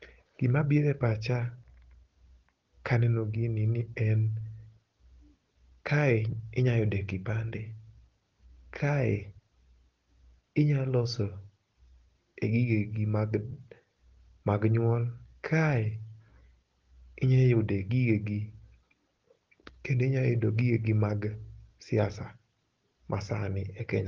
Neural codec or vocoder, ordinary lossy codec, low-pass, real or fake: none; Opus, 16 kbps; 7.2 kHz; real